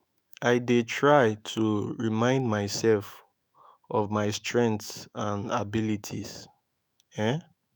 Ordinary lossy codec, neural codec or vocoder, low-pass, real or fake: none; autoencoder, 48 kHz, 128 numbers a frame, DAC-VAE, trained on Japanese speech; none; fake